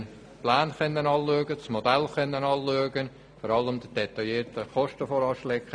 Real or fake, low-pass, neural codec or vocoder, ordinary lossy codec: real; 9.9 kHz; none; none